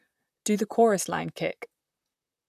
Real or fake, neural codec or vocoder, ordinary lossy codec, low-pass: fake; vocoder, 44.1 kHz, 128 mel bands, Pupu-Vocoder; none; 14.4 kHz